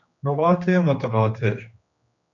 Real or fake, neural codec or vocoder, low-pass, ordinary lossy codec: fake; codec, 16 kHz, 2 kbps, X-Codec, HuBERT features, trained on general audio; 7.2 kHz; MP3, 64 kbps